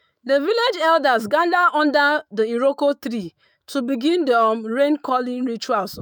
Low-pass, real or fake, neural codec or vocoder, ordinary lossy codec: none; fake; autoencoder, 48 kHz, 128 numbers a frame, DAC-VAE, trained on Japanese speech; none